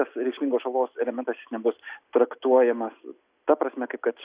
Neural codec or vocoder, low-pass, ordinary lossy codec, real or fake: none; 3.6 kHz; Opus, 64 kbps; real